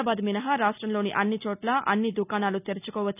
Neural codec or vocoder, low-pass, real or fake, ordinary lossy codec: none; 3.6 kHz; real; none